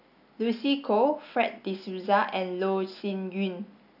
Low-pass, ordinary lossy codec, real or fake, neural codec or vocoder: 5.4 kHz; none; real; none